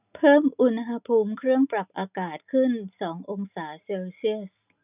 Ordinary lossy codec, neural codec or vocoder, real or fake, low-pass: none; none; real; 3.6 kHz